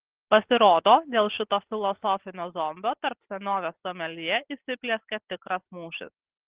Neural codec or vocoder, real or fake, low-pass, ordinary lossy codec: none; real; 3.6 kHz; Opus, 16 kbps